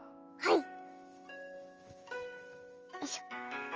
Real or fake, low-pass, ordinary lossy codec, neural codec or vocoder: fake; 7.2 kHz; Opus, 24 kbps; autoencoder, 48 kHz, 128 numbers a frame, DAC-VAE, trained on Japanese speech